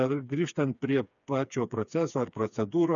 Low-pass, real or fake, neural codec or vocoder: 7.2 kHz; fake; codec, 16 kHz, 4 kbps, FreqCodec, smaller model